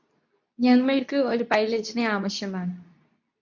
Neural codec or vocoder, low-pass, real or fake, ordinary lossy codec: codec, 24 kHz, 0.9 kbps, WavTokenizer, medium speech release version 2; 7.2 kHz; fake; MP3, 64 kbps